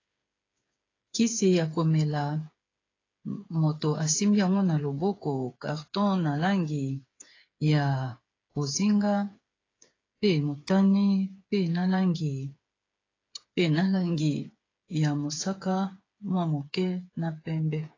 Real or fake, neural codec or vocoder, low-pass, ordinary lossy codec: fake; codec, 16 kHz, 8 kbps, FreqCodec, smaller model; 7.2 kHz; AAC, 32 kbps